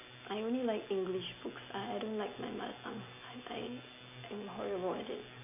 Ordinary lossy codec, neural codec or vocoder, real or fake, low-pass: none; none; real; 3.6 kHz